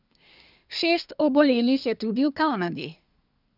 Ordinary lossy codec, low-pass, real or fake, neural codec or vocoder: none; 5.4 kHz; fake; codec, 24 kHz, 1 kbps, SNAC